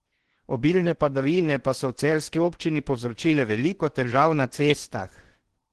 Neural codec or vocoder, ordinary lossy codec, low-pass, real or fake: codec, 16 kHz in and 24 kHz out, 0.8 kbps, FocalCodec, streaming, 65536 codes; Opus, 16 kbps; 10.8 kHz; fake